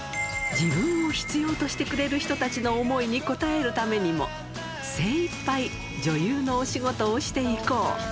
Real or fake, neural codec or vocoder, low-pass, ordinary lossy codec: real; none; none; none